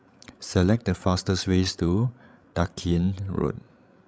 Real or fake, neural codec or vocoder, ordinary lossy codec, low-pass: fake; codec, 16 kHz, 16 kbps, FreqCodec, larger model; none; none